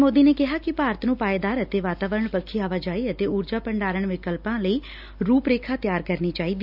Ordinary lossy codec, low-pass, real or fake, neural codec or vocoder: none; 5.4 kHz; real; none